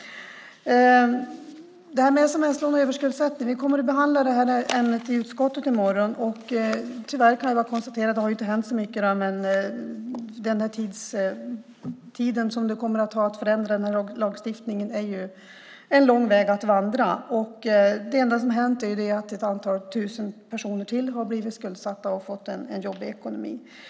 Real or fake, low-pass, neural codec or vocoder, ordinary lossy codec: real; none; none; none